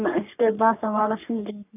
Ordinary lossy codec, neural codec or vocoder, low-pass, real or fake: none; codec, 44.1 kHz, 3.4 kbps, Pupu-Codec; 3.6 kHz; fake